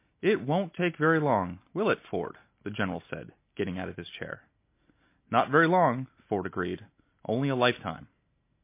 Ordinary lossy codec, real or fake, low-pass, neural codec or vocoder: MP3, 24 kbps; real; 3.6 kHz; none